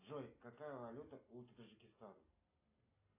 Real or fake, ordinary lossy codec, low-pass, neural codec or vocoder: real; AAC, 24 kbps; 3.6 kHz; none